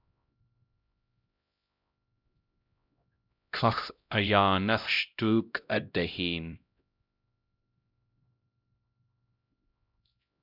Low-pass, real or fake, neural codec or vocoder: 5.4 kHz; fake; codec, 16 kHz, 0.5 kbps, X-Codec, HuBERT features, trained on LibriSpeech